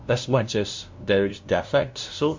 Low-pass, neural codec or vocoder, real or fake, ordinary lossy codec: 7.2 kHz; codec, 16 kHz, 0.5 kbps, FunCodec, trained on LibriTTS, 25 frames a second; fake; MP3, 48 kbps